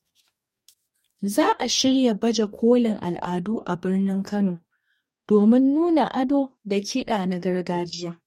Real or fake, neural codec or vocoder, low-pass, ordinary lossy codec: fake; codec, 44.1 kHz, 2.6 kbps, DAC; 19.8 kHz; MP3, 64 kbps